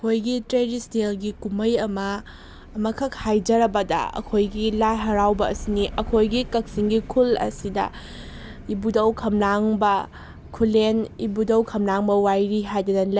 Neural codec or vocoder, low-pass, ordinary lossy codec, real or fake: none; none; none; real